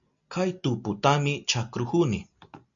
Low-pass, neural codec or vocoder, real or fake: 7.2 kHz; none; real